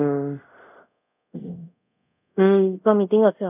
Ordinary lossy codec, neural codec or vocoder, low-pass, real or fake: none; codec, 24 kHz, 0.5 kbps, DualCodec; 3.6 kHz; fake